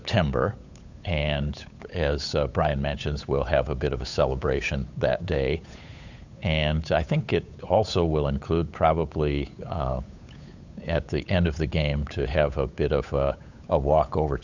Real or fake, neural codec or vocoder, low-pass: fake; codec, 16 kHz, 8 kbps, FunCodec, trained on Chinese and English, 25 frames a second; 7.2 kHz